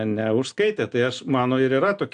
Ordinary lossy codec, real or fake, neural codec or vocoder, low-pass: AAC, 64 kbps; real; none; 9.9 kHz